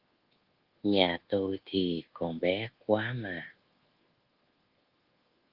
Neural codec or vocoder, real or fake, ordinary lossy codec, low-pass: codec, 24 kHz, 1.2 kbps, DualCodec; fake; Opus, 16 kbps; 5.4 kHz